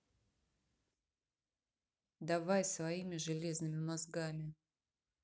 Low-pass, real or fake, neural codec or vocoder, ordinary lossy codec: none; real; none; none